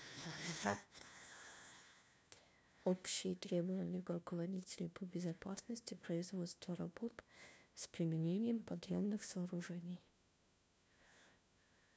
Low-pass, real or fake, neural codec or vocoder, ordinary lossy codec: none; fake; codec, 16 kHz, 1 kbps, FunCodec, trained on LibriTTS, 50 frames a second; none